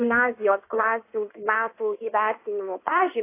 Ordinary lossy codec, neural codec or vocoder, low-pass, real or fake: AAC, 24 kbps; codec, 16 kHz in and 24 kHz out, 1.1 kbps, FireRedTTS-2 codec; 3.6 kHz; fake